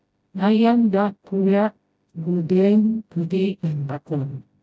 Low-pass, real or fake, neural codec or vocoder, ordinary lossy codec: none; fake; codec, 16 kHz, 0.5 kbps, FreqCodec, smaller model; none